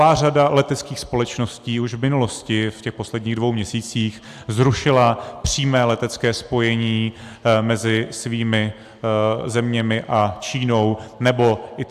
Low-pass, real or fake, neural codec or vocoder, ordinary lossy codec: 14.4 kHz; real; none; AAC, 96 kbps